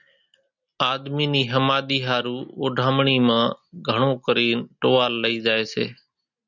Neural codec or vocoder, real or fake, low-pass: none; real; 7.2 kHz